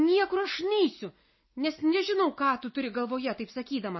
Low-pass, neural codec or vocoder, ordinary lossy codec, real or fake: 7.2 kHz; none; MP3, 24 kbps; real